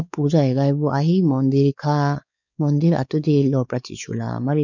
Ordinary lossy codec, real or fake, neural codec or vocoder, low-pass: none; fake; codec, 16 kHz, 4 kbps, X-Codec, WavLM features, trained on Multilingual LibriSpeech; 7.2 kHz